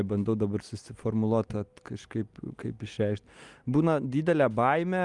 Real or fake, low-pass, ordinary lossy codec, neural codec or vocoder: real; 10.8 kHz; Opus, 32 kbps; none